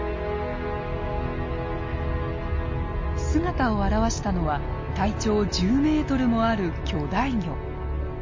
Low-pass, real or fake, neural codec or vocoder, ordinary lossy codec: 7.2 kHz; real; none; MP3, 32 kbps